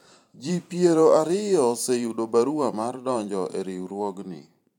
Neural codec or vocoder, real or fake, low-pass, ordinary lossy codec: vocoder, 44.1 kHz, 128 mel bands every 512 samples, BigVGAN v2; fake; 19.8 kHz; none